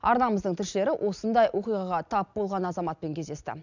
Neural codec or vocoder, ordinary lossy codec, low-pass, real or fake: none; none; 7.2 kHz; real